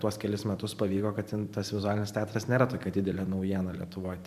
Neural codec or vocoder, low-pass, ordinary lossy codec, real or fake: vocoder, 44.1 kHz, 128 mel bands every 512 samples, BigVGAN v2; 14.4 kHz; MP3, 96 kbps; fake